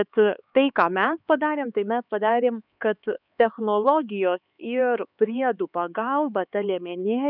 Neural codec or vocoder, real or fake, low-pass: codec, 16 kHz, 4 kbps, X-Codec, HuBERT features, trained on LibriSpeech; fake; 5.4 kHz